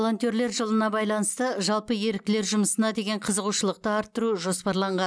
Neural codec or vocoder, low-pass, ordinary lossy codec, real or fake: none; none; none; real